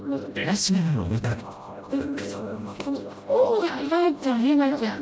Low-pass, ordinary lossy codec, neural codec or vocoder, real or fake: none; none; codec, 16 kHz, 0.5 kbps, FreqCodec, smaller model; fake